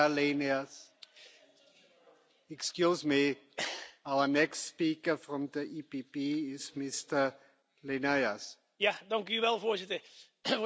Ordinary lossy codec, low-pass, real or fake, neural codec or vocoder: none; none; real; none